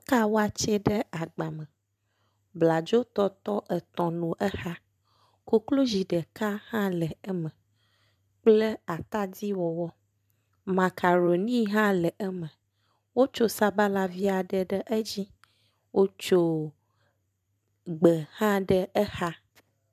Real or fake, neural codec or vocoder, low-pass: fake; vocoder, 44.1 kHz, 128 mel bands every 512 samples, BigVGAN v2; 14.4 kHz